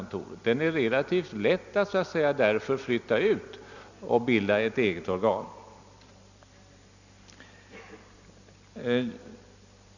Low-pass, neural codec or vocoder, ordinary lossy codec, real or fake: 7.2 kHz; none; none; real